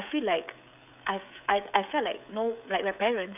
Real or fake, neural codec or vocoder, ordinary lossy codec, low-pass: fake; codec, 16 kHz, 16 kbps, FreqCodec, smaller model; none; 3.6 kHz